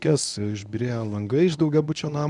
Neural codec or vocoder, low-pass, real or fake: codec, 24 kHz, 0.9 kbps, WavTokenizer, medium speech release version 1; 10.8 kHz; fake